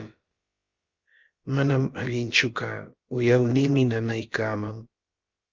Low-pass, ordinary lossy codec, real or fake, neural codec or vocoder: 7.2 kHz; Opus, 32 kbps; fake; codec, 16 kHz, about 1 kbps, DyCAST, with the encoder's durations